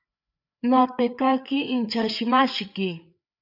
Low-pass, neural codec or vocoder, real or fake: 5.4 kHz; codec, 16 kHz, 4 kbps, FreqCodec, larger model; fake